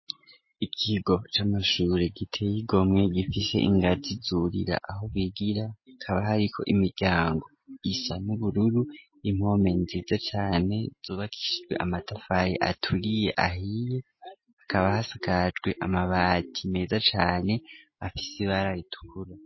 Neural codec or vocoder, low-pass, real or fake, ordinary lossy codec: none; 7.2 kHz; real; MP3, 24 kbps